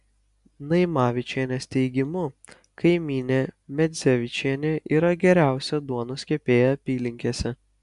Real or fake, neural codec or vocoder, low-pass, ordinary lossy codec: real; none; 10.8 kHz; AAC, 96 kbps